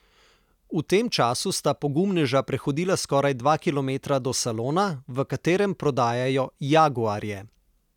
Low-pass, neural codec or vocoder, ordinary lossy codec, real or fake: 19.8 kHz; none; none; real